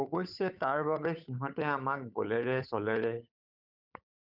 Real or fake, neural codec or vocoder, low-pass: fake; codec, 16 kHz, 8 kbps, FunCodec, trained on Chinese and English, 25 frames a second; 5.4 kHz